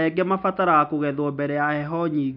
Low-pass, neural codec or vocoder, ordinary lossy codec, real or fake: 5.4 kHz; none; none; real